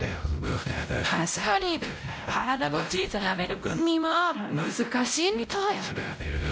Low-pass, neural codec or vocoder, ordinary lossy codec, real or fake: none; codec, 16 kHz, 0.5 kbps, X-Codec, WavLM features, trained on Multilingual LibriSpeech; none; fake